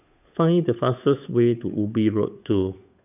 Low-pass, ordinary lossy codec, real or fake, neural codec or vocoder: 3.6 kHz; none; fake; codec, 16 kHz, 8 kbps, FunCodec, trained on Chinese and English, 25 frames a second